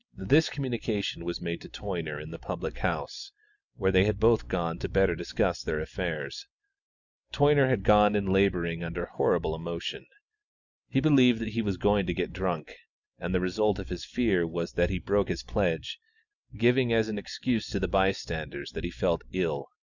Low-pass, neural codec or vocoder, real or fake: 7.2 kHz; none; real